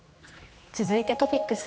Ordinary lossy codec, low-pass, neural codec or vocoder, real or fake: none; none; codec, 16 kHz, 2 kbps, X-Codec, HuBERT features, trained on general audio; fake